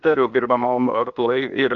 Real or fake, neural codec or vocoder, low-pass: fake; codec, 16 kHz, 0.8 kbps, ZipCodec; 7.2 kHz